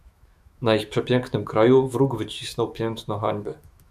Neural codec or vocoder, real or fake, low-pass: autoencoder, 48 kHz, 128 numbers a frame, DAC-VAE, trained on Japanese speech; fake; 14.4 kHz